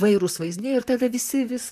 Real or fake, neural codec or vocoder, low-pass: fake; vocoder, 44.1 kHz, 128 mel bands, Pupu-Vocoder; 14.4 kHz